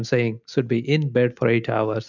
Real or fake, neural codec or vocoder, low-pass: real; none; 7.2 kHz